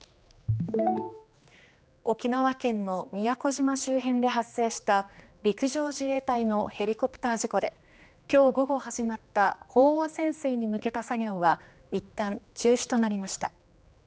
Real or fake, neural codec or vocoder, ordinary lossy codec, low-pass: fake; codec, 16 kHz, 2 kbps, X-Codec, HuBERT features, trained on general audio; none; none